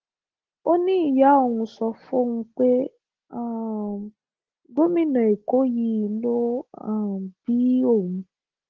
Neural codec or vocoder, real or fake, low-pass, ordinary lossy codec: none; real; 7.2 kHz; Opus, 16 kbps